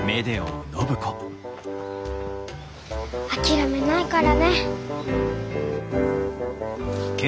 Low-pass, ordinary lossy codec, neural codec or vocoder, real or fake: none; none; none; real